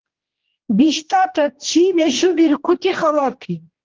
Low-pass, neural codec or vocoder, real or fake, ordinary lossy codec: 7.2 kHz; codec, 16 kHz, 1 kbps, X-Codec, HuBERT features, trained on general audio; fake; Opus, 16 kbps